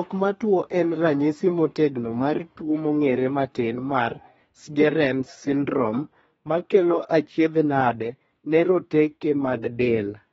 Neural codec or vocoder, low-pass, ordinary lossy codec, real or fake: codec, 32 kHz, 1.9 kbps, SNAC; 14.4 kHz; AAC, 24 kbps; fake